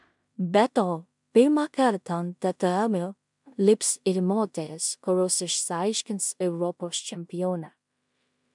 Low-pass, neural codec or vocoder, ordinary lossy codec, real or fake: 10.8 kHz; codec, 16 kHz in and 24 kHz out, 0.4 kbps, LongCat-Audio-Codec, two codebook decoder; MP3, 96 kbps; fake